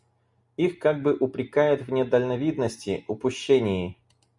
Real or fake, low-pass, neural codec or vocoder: real; 10.8 kHz; none